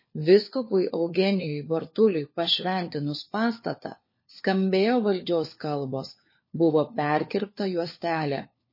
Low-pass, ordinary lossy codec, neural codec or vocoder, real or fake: 5.4 kHz; MP3, 24 kbps; codec, 16 kHz, 4 kbps, FunCodec, trained on LibriTTS, 50 frames a second; fake